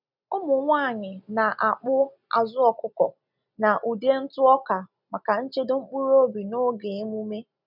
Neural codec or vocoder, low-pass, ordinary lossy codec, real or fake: none; 5.4 kHz; none; real